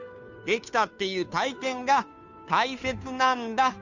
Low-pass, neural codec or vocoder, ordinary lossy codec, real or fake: 7.2 kHz; codec, 16 kHz, 2 kbps, FunCodec, trained on Chinese and English, 25 frames a second; none; fake